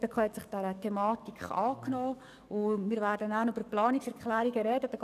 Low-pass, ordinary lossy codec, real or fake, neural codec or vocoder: 14.4 kHz; none; fake; codec, 44.1 kHz, 7.8 kbps, DAC